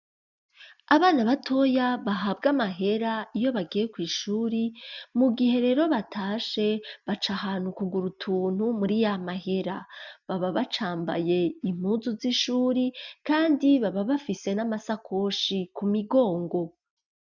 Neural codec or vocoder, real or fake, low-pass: none; real; 7.2 kHz